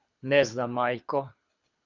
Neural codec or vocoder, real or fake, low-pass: codec, 24 kHz, 6 kbps, HILCodec; fake; 7.2 kHz